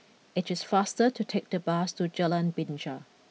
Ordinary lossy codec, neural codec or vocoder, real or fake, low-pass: none; none; real; none